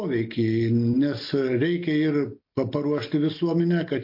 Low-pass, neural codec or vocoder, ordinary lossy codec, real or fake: 5.4 kHz; none; MP3, 32 kbps; real